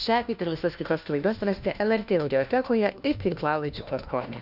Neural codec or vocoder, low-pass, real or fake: codec, 16 kHz, 1 kbps, FunCodec, trained on LibriTTS, 50 frames a second; 5.4 kHz; fake